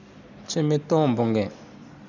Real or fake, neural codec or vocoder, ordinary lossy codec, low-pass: real; none; none; 7.2 kHz